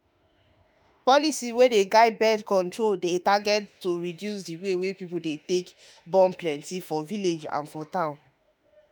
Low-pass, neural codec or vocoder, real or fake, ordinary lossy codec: none; autoencoder, 48 kHz, 32 numbers a frame, DAC-VAE, trained on Japanese speech; fake; none